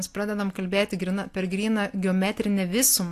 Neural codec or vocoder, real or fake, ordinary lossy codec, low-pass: none; real; AAC, 64 kbps; 14.4 kHz